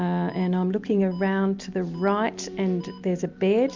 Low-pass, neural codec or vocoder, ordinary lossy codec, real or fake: 7.2 kHz; none; MP3, 64 kbps; real